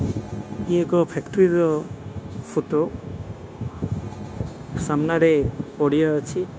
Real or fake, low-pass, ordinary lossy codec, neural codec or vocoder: fake; none; none; codec, 16 kHz, 0.9 kbps, LongCat-Audio-Codec